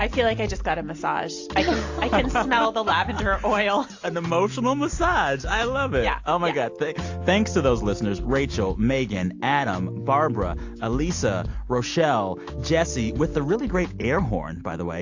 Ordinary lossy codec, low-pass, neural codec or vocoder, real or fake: AAC, 48 kbps; 7.2 kHz; none; real